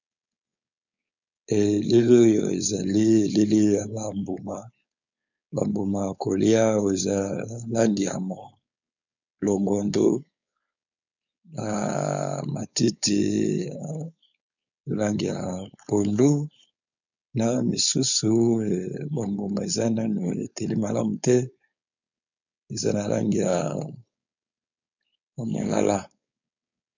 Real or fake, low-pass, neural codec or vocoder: fake; 7.2 kHz; codec, 16 kHz, 4.8 kbps, FACodec